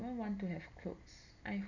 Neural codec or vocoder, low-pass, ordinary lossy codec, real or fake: none; 7.2 kHz; MP3, 64 kbps; real